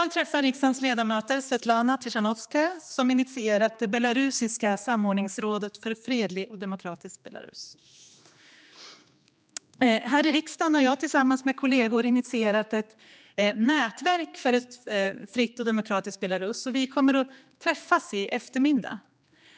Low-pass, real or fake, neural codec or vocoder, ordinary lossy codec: none; fake; codec, 16 kHz, 2 kbps, X-Codec, HuBERT features, trained on general audio; none